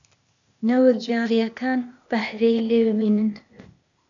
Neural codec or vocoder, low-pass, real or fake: codec, 16 kHz, 0.8 kbps, ZipCodec; 7.2 kHz; fake